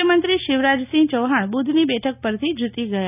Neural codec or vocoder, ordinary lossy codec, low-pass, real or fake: none; none; 3.6 kHz; real